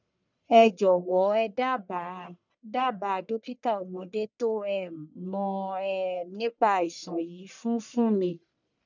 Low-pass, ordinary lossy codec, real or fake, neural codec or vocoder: 7.2 kHz; none; fake; codec, 44.1 kHz, 1.7 kbps, Pupu-Codec